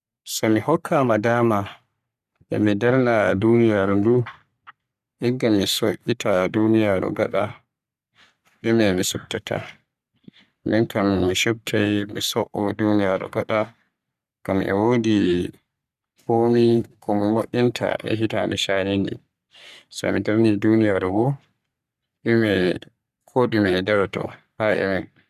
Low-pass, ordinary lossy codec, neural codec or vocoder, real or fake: 14.4 kHz; none; codec, 44.1 kHz, 3.4 kbps, Pupu-Codec; fake